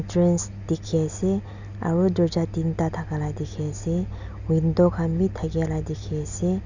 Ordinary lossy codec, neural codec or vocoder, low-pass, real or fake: none; none; 7.2 kHz; real